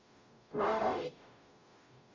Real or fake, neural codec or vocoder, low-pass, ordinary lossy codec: fake; codec, 44.1 kHz, 0.9 kbps, DAC; 7.2 kHz; MP3, 64 kbps